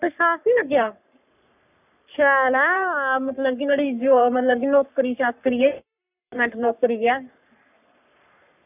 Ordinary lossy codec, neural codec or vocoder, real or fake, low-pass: none; codec, 44.1 kHz, 3.4 kbps, Pupu-Codec; fake; 3.6 kHz